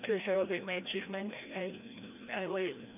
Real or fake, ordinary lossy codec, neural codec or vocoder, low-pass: fake; none; codec, 16 kHz, 1 kbps, FreqCodec, larger model; 3.6 kHz